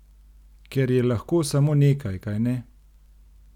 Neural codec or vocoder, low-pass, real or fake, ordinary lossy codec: none; 19.8 kHz; real; none